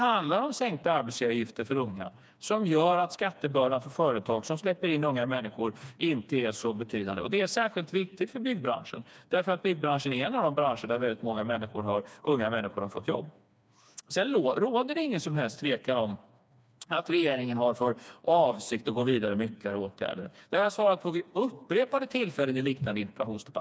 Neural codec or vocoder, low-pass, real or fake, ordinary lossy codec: codec, 16 kHz, 2 kbps, FreqCodec, smaller model; none; fake; none